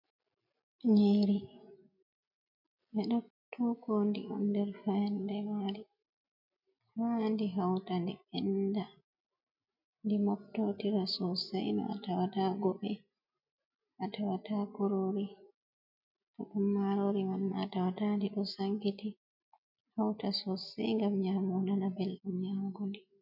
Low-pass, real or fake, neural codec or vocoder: 5.4 kHz; real; none